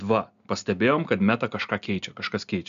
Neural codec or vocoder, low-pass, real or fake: none; 7.2 kHz; real